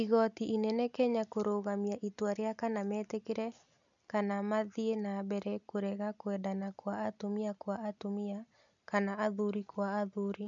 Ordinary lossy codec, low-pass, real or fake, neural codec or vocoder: none; 7.2 kHz; real; none